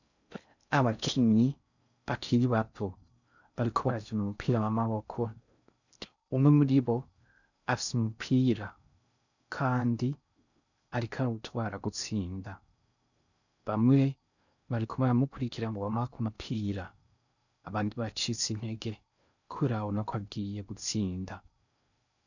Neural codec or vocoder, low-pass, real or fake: codec, 16 kHz in and 24 kHz out, 0.6 kbps, FocalCodec, streaming, 4096 codes; 7.2 kHz; fake